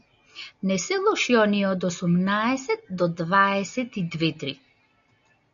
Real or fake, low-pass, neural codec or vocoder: real; 7.2 kHz; none